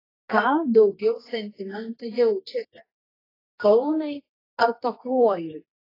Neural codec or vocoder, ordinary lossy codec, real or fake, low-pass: codec, 24 kHz, 0.9 kbps, WavTokenizer, medium music audio release; AAC, 24 kbps; fake; 5.4 kHz